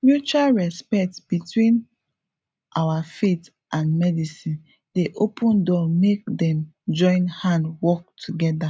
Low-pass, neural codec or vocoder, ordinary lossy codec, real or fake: none; none; none; real